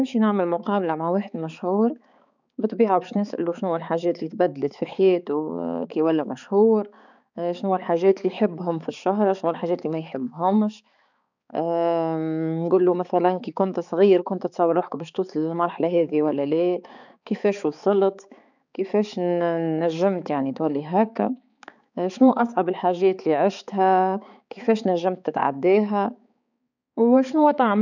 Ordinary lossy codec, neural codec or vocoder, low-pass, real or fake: none; codec, 16 kHz, 4 kbps, X-Codec, HuBERT features, trained on balanced general audio; 7.2 kHz; fake